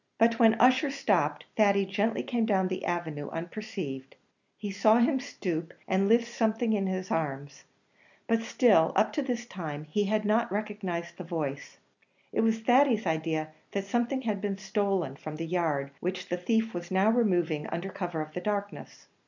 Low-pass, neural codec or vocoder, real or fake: 7.2 kHz; none; real